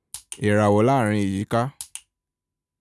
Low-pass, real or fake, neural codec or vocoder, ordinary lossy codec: none; real; none; none